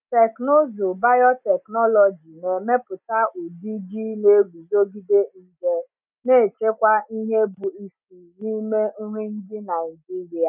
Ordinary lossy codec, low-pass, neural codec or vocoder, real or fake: none; 3.6 kHz; none; real